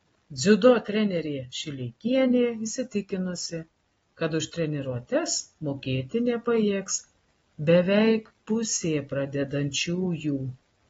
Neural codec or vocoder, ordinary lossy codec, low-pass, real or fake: none; AAC, 24 kbps; 19.8 kHz; real